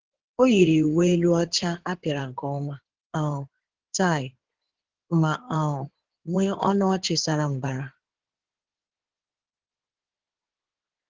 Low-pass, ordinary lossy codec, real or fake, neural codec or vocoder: 7.2 kHz; Opus, 16 kbps; fake; codec, 16 kHz in and 24 kHz out, 2.2 kbps, FireRedTTS-2 codec